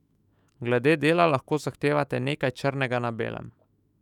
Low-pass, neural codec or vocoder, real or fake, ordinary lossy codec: 19.8 kHz; autoencoder, 48 kHz, 128 numbers a frame, DAC-VAE, trained on Japanese speech; fake; none